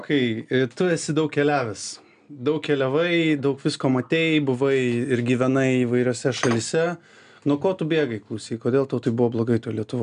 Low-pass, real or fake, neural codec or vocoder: 9.9 kHz; real; none